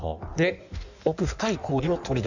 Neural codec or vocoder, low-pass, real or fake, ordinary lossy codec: codec, 16 kHz in and 24 kHz out, 1.1 kbps, FireRedTTS-2 codec; 7.2 kHz; fake; none